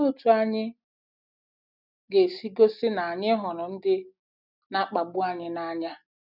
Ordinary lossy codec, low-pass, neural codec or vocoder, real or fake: none; 5.4 kHz; none; real